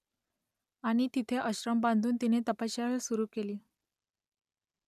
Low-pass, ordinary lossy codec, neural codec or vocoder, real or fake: 14.4 kHz; none; none; real